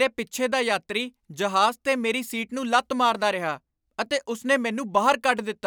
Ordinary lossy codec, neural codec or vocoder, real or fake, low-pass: none; none; real; none